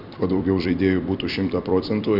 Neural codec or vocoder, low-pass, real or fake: vocoder, 44.1 kHz, 128 mel bands every 256 samples, BigVGAN v2; 5.4 kHz; fake